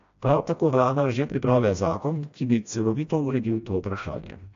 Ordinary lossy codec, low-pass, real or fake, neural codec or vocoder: AAC, 64 kbps; 7.2 kHz; fake; codec, 16 kHz, 1 kbps, FreqCodec, smaller model